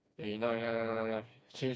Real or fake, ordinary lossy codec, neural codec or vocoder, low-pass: fake; none; codec, 16 kHz, 2 kbps, FreqCodec, smaller model; none